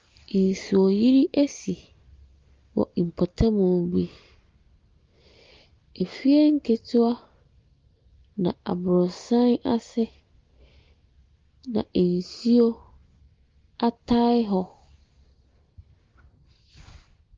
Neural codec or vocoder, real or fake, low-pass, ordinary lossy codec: none; real; 7.2 kHz; Opus, 32 kbps